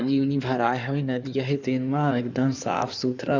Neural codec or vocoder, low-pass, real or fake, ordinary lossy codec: codec, 16 kHz in and 24 kHz out, 2.2 kbps, FireRedTTS-2 codec; 7.2 kHz; fake; none